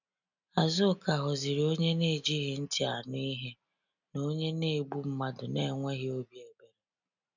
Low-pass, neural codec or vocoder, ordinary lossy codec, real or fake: 7.2 kHz; none; none; real